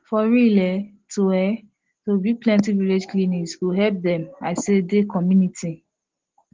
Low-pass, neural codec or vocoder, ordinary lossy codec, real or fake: 7.2 kHz; none; Opus, 16 kbps; real